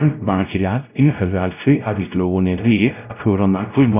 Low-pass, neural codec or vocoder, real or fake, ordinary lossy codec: 3.6 kHz; codec, 16 kHz, 0.5 kbps, X-Codec, WavLM features, trained on Multilingual LibriSpeech; fake; none